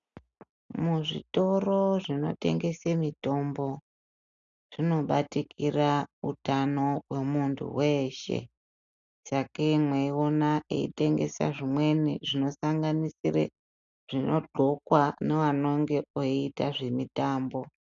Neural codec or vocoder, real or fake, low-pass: none; real; 7.2 kHz